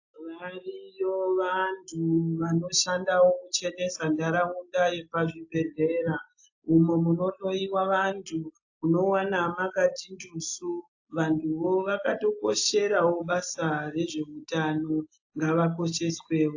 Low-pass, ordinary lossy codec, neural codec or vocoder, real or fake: 7.2 kHz; AAC, 48 kbps; none; real